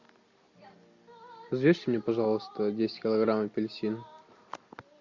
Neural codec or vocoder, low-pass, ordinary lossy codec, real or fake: none; 7.2 kHz; MP3, 48 kbps; real